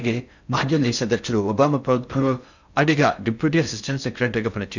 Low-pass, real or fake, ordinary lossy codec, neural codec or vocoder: 7.2 kHz; fake; none; codec, 16 kHz in and 24 kHz out, 0.6 kbps, FocalCodec, streaming, 4096 codes